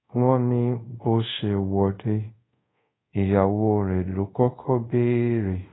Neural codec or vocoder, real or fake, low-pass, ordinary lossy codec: codec, 24 kHz, 0.5 kbps, DualCodec; fake; 7.2 kHz; AAC, 16 kbps